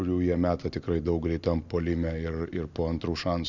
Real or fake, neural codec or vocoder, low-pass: real; none; 7.2 kHz